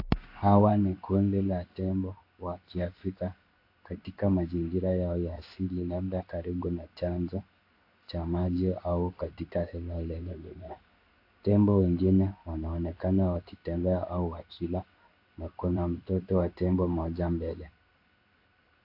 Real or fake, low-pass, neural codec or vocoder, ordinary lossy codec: fake; 5.4 kHz; codec, 16 kHz in and 24 kHz out, 1 kbps, XY-Tokenizer; AAC, 32 kbps